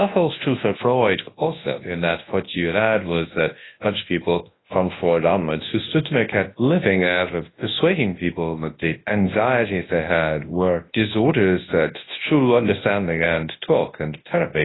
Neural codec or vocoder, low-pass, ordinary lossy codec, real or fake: codec, 24 kHz, 0.9 kbps, WavTokenizer, large speech release; 7.2 kHz; AAC, 16 kbps; fake